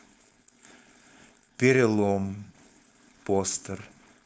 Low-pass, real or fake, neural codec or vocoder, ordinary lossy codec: none; fake; codec, 16 kHz, 4.8 kbps, FACodec; none